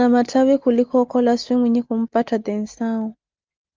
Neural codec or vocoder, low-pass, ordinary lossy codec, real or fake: none; 7.2 kHz; Opus, 32 kbps; real